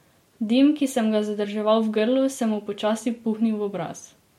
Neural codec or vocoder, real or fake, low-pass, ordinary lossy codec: none; real; 19.8 kHz; MP3, 64 kbps